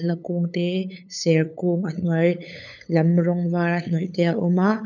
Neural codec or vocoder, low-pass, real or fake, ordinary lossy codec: codec, 16 kHz, 8 kbps, FunCodec, trained on LibriTTS, 25 frames a second; 7.2 kHz; fake; none